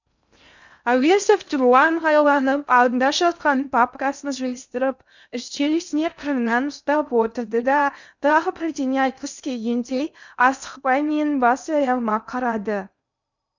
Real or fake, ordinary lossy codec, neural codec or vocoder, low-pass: fake; none; codec, 16 kHz in and 24 kHz out, 0.6 kbps, FocalCodec, streaming, 2048 codes; 7.2 kHz